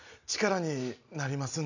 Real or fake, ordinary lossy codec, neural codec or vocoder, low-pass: real; none; none; 7.2 kHz